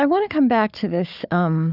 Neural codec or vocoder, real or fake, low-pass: codec, 16 kHz, 4 kbps, FunCodec, trained on Chinese and English, 50 frames a second; fake; 5.4 kHz